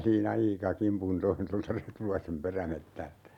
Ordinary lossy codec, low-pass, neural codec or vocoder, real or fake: none; 19.8 kHz; none; real